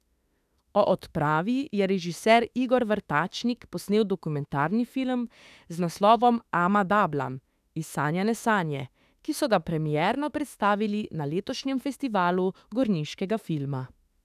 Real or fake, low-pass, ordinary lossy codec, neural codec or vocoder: fake; 14.4 kHz; none; autoencoder, 48 kHz, 32 numbers a frame, DAC-VAE, trained on Japanese speech